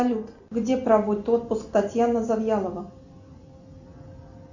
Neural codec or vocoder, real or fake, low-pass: none; real; 7.2 kHz